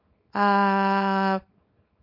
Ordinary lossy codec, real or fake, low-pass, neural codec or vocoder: MP3, 32 kbps; fake; 5.4 kHz; codec, 24 kHz, 0.9 kbps, WavTokenizer, small release